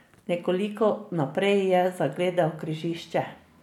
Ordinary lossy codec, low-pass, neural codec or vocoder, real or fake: none; 19.8 kHz; none; real